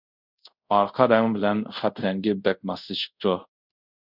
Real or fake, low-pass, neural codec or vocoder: fake; 5.4 kHz; codec, 24 kHz, 0.5 kbps, DualCodec